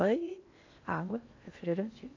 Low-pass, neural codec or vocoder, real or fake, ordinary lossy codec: 7.2 kHz; codec, 16 kHz in and 24 kHz out, 0.8 kbps, FocalCodec, streaming, 65536 codes; fake; AAC, 48 kbps